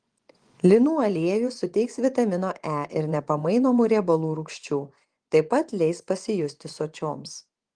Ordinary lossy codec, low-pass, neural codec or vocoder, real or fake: Opus, 24 kbps; 9.9 kHz; vocoder, 24 kHz, 100 mel bands, Vocos; fake